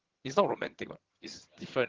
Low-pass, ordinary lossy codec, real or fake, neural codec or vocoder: 7.2 kHz; Opus, 16 kbps; fake; vocoder, 22.05 kHz, 80 mel bands, HiFi-GAN